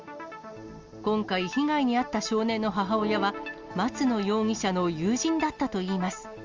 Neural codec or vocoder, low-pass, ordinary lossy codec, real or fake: none; 7.2 kHz; Opus, 32 kbps; real